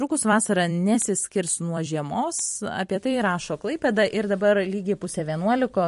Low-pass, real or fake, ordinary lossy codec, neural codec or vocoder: 14.4 kHz; fake; MP3, 48 kbps; vocoder, 44.1 kHz, 128 mel bands every 256 samples, BigVGAN v2